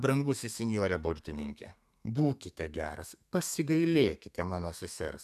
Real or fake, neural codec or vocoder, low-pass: fake; codec, 32 kHz, 1.9 kbps, SNAC; 14.4 kHz